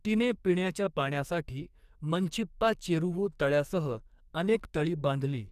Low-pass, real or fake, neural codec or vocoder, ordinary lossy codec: 14.4 kHz; fake; codec, 44.1 kHz, 2.6 kbps, SNAC; none